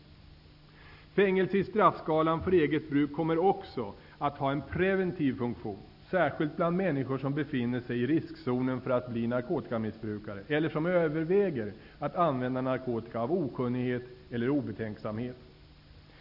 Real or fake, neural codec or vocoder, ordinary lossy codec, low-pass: real; none; none; 5.4 kHz